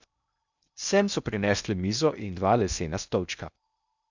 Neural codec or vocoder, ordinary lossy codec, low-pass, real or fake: codec, 16 kHz in and 24 kHz out, 0.6 kbps, FocalCodec, streaming, 2048 codes; none; 7.2 kHz; fake